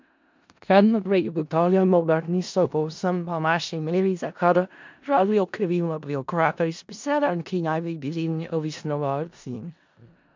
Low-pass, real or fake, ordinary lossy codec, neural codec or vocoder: 7.2 kHz; fake; MP3, 64 kbps; codec, 16 kHz in and 24 kHz out, 0.4 kbps, LongCat-Audio-Codec, four codebook decoder